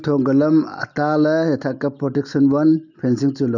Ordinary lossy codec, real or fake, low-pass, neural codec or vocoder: none; real; 7.2 kHz; none